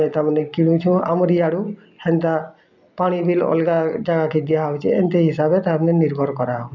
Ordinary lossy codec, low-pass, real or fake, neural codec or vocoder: none; 7.2 kHz; real; none